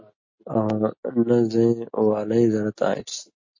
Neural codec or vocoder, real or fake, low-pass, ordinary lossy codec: none; real; 7.2 kHz; MP3, 32 kbps